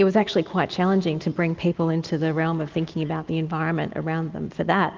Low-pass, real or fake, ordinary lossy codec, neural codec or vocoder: 7.2 kHz; real; Opus, 32 kbps; none